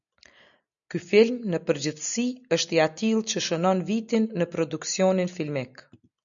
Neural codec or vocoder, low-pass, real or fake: none; 7.2 kHz; real